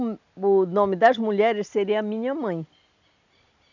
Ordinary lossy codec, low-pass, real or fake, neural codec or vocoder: none; 7.2 kHz; real; none